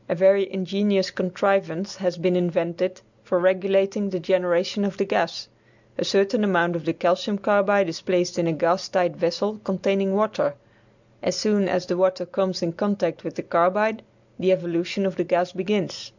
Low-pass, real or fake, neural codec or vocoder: 7.2 kHz; real; none